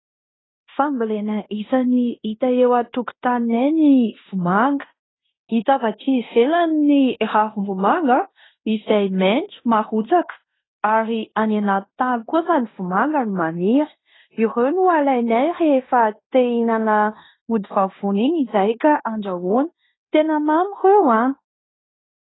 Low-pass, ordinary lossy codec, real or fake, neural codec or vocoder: 7.2 kHz; AAC, 16 kbps; fake; codec, 16 kHz in and 24 kHz out, 0.9 kbps, LongCat-Audio-Codec, fine tuned four codebook decoder